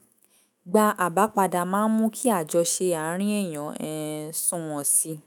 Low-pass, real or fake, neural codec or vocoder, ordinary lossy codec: none; fake; autoencoder, 48 kHz, 128 numbers a frame, DAC-VAE, trained on Japanese speech; none